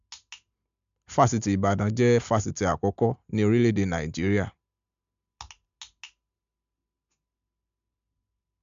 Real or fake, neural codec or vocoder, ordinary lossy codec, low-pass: real; none; MP3, 64 kbps; 7.2 kHz